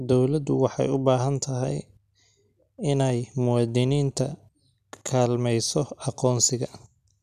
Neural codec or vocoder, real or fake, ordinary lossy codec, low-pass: none; real; none; 14.4 kHz